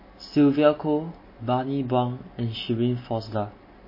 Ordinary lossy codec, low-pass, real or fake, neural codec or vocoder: MP3, 24 kbps; 5.4 kHz; real; none